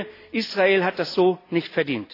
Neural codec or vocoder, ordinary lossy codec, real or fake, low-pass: none; AAC, 32 kbps; real; 5.4 kHz